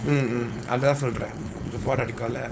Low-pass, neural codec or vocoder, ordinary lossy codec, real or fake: none; codec, 16 kHz, 4.8 kbps, FACodec; none; fake